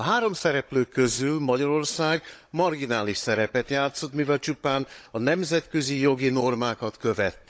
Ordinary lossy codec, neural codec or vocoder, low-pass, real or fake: none; codec, 16 kHz, 16 kbps, FunCodec, trained on Chinese and English, 50 frames a second; none; fake